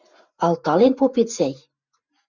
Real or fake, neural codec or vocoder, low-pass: real; none; 7.2 kHz